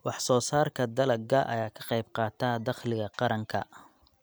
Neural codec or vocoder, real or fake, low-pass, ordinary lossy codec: none; real; none; none